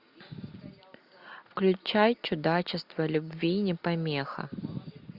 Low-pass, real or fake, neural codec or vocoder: 5.4 kHz; real; none